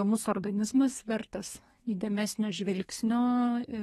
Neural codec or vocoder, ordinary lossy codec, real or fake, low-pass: codec, 32 kHz, 1.9 kbps, SNAC; AAC, 32 kbps; fake; 14.4 kHz